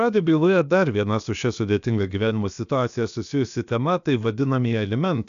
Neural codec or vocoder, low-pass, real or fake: codec, 16 kHz, about 1 kbps, DyCAST, with the encoder's durations; 7.2 kHz; fake